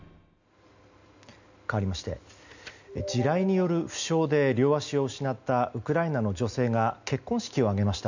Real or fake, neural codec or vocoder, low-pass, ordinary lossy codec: real; none; 7.2 kHz; none